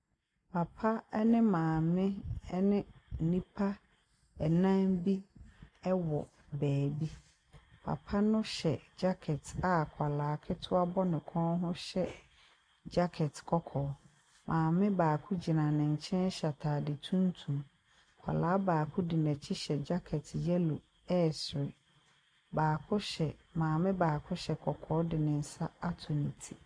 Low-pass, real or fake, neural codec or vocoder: 9.9 kHz; real; none